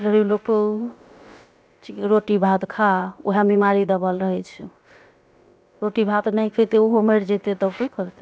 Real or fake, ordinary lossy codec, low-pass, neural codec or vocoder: fake; none; none; codec, 16 kHz, about 1 kbps, DyCAST, with the encoder's durations